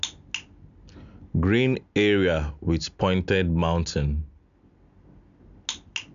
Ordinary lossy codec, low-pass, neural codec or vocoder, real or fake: AAC, 96 kbps; 7.2 kHz; none; real